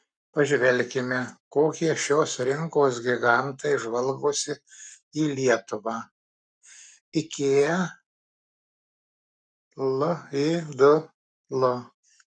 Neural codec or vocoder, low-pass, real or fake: codec, 44.1 kHz, 7.8 kbps, Pupu-Codec; 9.9 kHz; fake